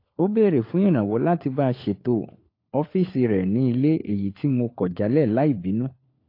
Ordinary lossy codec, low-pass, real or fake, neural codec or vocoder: AAC, 32 kbps; 5.4 kHz; fake; codec, 16 kHz, 4 kbps, FunCodec, trained on LibriTTS, 50 frames a second